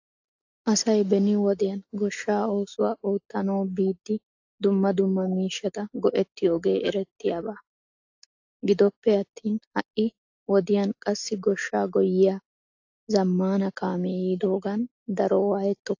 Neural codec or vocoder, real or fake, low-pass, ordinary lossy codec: none; real; 7.2 kHz; AAC, 48 kbps